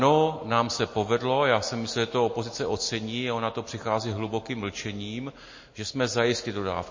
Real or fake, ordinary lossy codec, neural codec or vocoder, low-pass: real; MP3, 32 kbps; none; 7.2 kHz